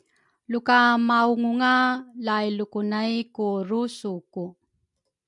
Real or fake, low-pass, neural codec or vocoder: real; 10.8 kHz; none